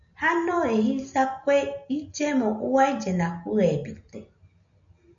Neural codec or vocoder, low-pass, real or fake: none; 7.2 kHz; real